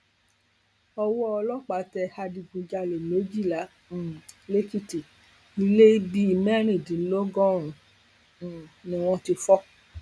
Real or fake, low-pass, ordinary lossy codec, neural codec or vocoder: real; none; none; none